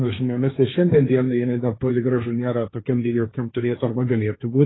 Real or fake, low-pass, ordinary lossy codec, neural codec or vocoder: fake; 7.2 kHz; AAC, 16 kbps; codec, 16 kHz, 1.1 kbps, Voila-Tokenizer